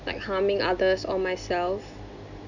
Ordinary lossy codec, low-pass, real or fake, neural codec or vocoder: none; 7.2 kHz; real; none